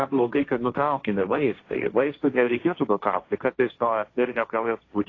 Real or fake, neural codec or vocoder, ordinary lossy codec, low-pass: fake; codec, 16 kHz, 1.1 kbps, Voila-Tokenizer; AAC, 32 kbps; 7.2 kHz